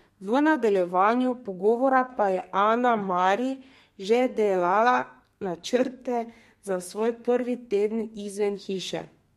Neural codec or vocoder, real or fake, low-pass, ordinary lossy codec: codec, 32 kHz, 1.9 kbps, SNAC; fake; 14.4 kHz; MP3, 64 kbps